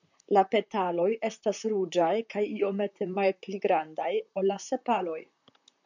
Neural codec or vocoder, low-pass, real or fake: vocoder, 44.1 kHz, 128 mel bands, Pupu-Vocoder; 7.2 kHz; fake